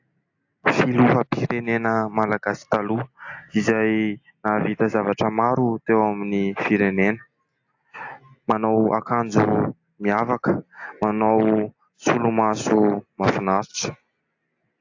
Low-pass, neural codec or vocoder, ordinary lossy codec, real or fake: 7.2 kHz; none; AAC, 48 kbps; real